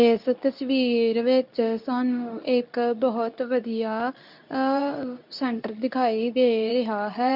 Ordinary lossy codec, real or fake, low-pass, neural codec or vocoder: none; fake; 5.4 kHz; codec, 24 kHz, 0.9 kbps, WavTokenizer, medium speech release version 2